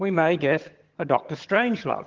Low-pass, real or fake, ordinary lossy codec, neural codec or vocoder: 7.2 kHz; fake; Opus, 16 kbps; vocoder, 22.05 kHz, 80 mel bands, HiFi-GAN